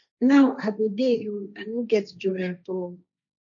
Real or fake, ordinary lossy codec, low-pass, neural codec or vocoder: fake; none; 7.2 kHz; codec, 16 kHz, 1.1 kbps, Voila-Tokenizer